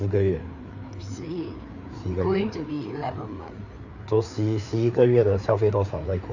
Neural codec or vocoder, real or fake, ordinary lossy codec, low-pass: codec, 16 kHz, 4 kbps, FreqCodec, larger model; fake; none; 7.2 kHz